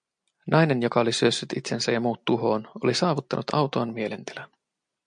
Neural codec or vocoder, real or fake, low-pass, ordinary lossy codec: none; real; 9.9 kHz; MP3, 64 kbps